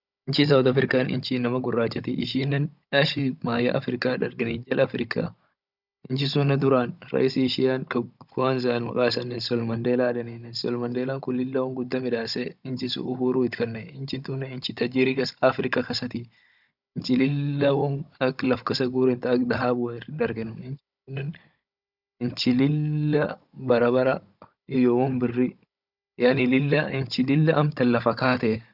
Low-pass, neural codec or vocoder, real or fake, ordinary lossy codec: 5.4 kHz; codec, 16 kHz, 16 kbps, FunCodec, trained on Chinese and English, 50 frames a second; fake; none